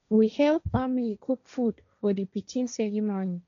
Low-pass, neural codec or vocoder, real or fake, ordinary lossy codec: 7.2 kHz; codec, 16 kHz, 1.1 kbps, Voila-Tokenizer; fake; none